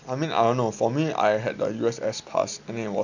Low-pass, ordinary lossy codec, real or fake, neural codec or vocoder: 7.2 kHz; none; real; none